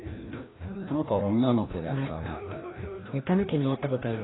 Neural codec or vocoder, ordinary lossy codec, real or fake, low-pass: codec, 16 kHz, 1 kbps, FreqCodec, larger model; AAC, 16 kbps; fake; 7.2 kHz